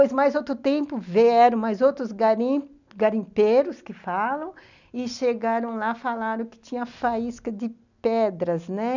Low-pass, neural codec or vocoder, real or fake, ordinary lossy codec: 7.2 kHz; none; real; none